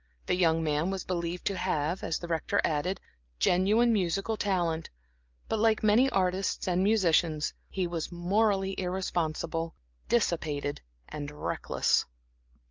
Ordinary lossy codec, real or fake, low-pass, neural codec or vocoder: Opus, 32 kbps; real; 7.2 kHz; none